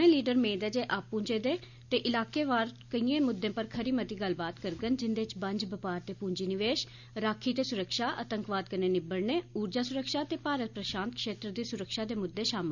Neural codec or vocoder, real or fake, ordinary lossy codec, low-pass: none; real; none; 7.2 kHz